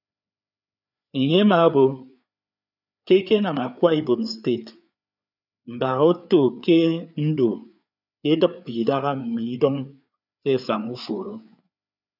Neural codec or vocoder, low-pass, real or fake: codec, 16 kHz, 4 kbps, FreqCodec, larger model; 5.4 kHz; fake